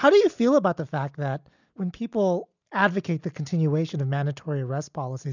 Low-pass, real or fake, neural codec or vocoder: 7.2 kHz; real; none